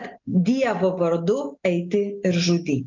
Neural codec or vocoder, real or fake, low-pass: none; real; 7.2 kHz